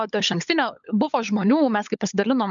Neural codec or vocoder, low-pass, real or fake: codec, 16 kHz, 16 kbps, FunCodec, trained on LibriTTS, 50 frames a second; 7.2 kHz; fake